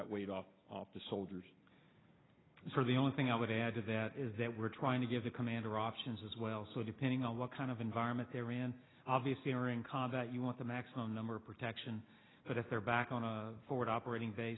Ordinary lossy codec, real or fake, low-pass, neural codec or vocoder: AAC, 16 kbps; real; 7.2 kHz; none